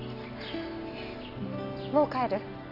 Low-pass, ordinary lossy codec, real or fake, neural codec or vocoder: 5.4 kHz; none; real; none